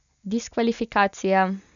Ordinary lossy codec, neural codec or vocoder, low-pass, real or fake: none; none; 7.2 kHz; real